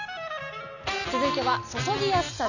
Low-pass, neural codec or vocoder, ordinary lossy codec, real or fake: 7.2 kHz; none; none; real